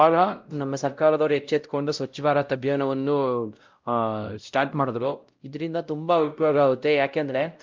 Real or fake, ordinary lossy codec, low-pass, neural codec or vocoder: fake; Opus, 24 kbps; 7.2 kHz; codec, 16 kHz, 0.5 kbps, X-Codec, WavLM features, trained on Multilingual LibriSpeech